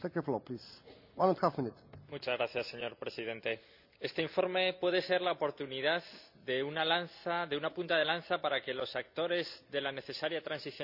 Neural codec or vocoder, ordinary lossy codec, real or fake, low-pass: none; none; real; 5.4 kHz